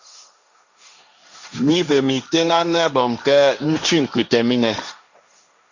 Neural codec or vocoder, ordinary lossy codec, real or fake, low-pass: codec, 16 kHz, 1.1 kbps, Voila-Tokenizer; Opus, 64 kbps; fake; 7.2 kHz